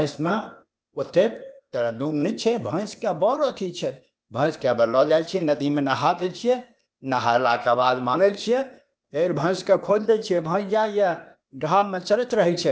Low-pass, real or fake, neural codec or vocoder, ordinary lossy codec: none; fake; codec, 16 kHz, 0.8 kbps, ZipCodec; none